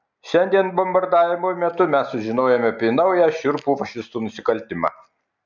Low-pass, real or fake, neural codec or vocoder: 7.2 kHz; real; none